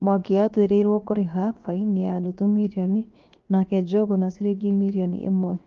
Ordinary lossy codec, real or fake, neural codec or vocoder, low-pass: Opus, 32 kbps; fake; codec, 16 kHz, 0.7 kbps, FocalCodec; 7.2 kHz